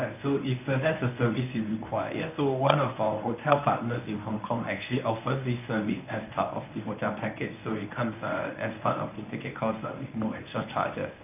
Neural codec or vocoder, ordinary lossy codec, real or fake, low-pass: codec, 24 kHz, 0.9 kbps, WavTokenizer, medium speech release version 1; none; fake; 3.6 kHz